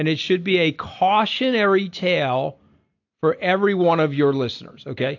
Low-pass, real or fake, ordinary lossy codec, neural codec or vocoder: 7.2 kHz; real; AAC, 48 kbps; none